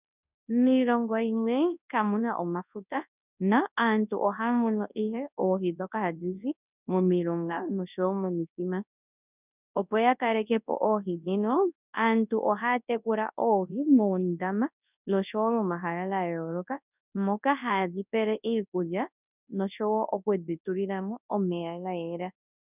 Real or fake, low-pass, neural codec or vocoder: fake; 3.6 kHz; codec, 24 kHz, 0.9 kbps, WavTokenizer, large speech release